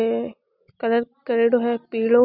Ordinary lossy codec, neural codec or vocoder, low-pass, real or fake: none; none; 5.4 kHz; real